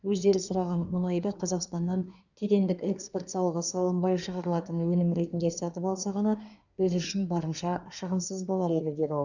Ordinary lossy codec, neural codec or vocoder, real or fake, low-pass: none; codec, 24 kHz, 1 kbps, SNAC; fake; 7.2 kHz